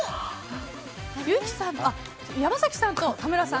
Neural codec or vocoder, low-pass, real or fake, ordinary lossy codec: none; none; real; none